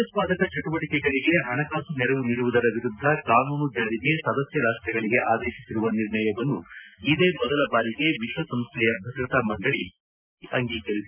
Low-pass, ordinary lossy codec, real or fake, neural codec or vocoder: 3.6 kHz; none; real; none